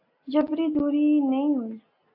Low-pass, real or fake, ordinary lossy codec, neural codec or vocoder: 5.4 kHz; real; AAC, 48 kbps; none